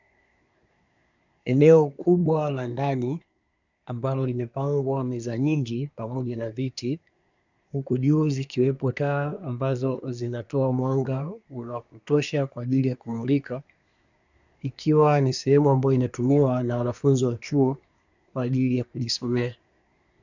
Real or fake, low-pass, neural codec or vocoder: fake; 7.2 kHz; codec, 24 kHz, 1 kbps, SNAC